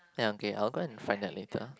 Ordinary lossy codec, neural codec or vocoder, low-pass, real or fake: none; none; none; real